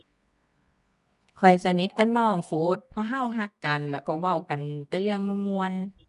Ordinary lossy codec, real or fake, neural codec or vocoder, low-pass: none; fake; codec, 24 kHz, 0.9 kbps, WavTokenizer, medium music audio release; 10.8 kHz